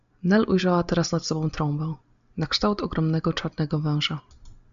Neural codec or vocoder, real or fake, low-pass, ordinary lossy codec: none; real; 7.2 kHz; MP3, 64 kbps